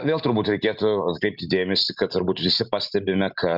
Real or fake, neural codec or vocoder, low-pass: real; none; 5.4 kHz